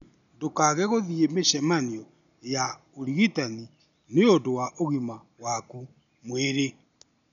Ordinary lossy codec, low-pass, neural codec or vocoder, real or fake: none; 7.2 kHz; none; real